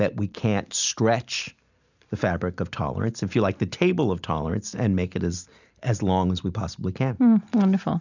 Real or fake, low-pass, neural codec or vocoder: real; 7.2 kHz; none